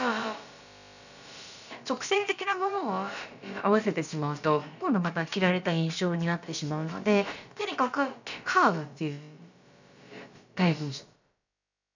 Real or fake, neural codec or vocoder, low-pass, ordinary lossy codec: fake; codec, 16 kHz, about 1 kbps, DyCAST, with the encoder's durations; 7.2 kHz; none